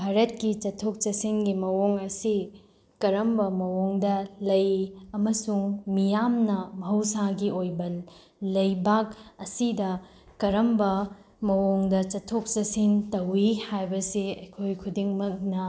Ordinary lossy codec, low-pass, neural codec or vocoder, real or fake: none; none; none; real